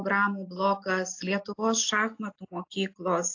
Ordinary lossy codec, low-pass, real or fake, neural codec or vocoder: AAC, 48 kbps; 7.2 kHz; real; none